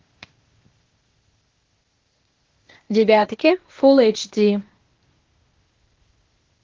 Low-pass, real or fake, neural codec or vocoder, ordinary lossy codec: 7.2 kHz; fake; codec, 16 kHz, 0.8 kbps, ZipCodec; Opus, 16 kbps